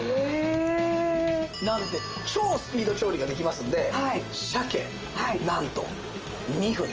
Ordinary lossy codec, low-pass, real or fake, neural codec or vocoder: Opus, 24 kbps; 7.2 kHz; real; none